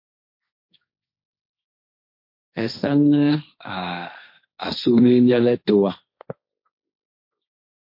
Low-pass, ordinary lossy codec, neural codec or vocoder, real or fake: 5.4 kHz; MP3, 32 kbps; codec, 16 kHz, 1.1 kbps, Voila-Tokenizer; fake